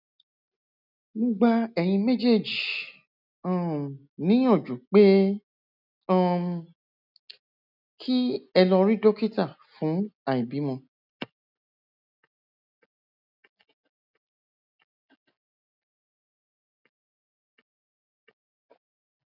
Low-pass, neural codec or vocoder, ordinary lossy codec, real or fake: 5.4 kHz; none; none; real